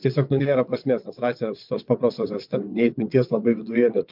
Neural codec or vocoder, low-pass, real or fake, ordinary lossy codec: vocoder, 22.05 kHz, 80 mel bands, WaveNeXt; 5.4 kHz; fake; MP3, 48 kbps